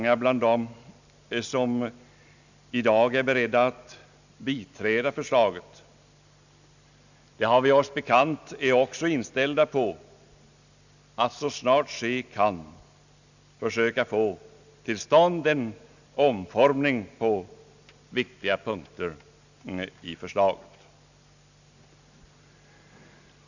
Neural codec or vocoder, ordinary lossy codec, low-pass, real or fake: none; none; 7.2 kHz; real